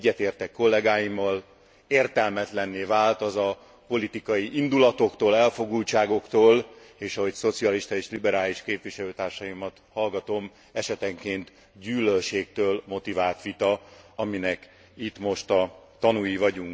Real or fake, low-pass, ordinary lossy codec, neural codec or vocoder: real; none; none; none